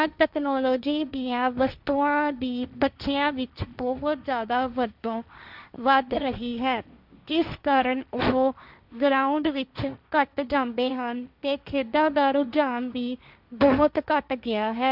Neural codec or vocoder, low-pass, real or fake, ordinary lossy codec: codec, 16 kHz, 1.1 kbps, Voila-Tokenizer; 5.4 kHz; fake; none